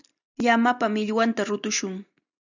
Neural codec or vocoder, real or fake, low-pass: none; real; 7.2 kHz